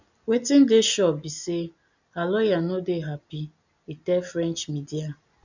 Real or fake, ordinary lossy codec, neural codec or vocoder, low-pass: real; none; none; 7.2 kHz